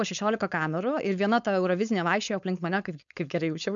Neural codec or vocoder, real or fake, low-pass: codec, 16 kHz, 4.8 kbps, FACodec; fake; 7.2 kHz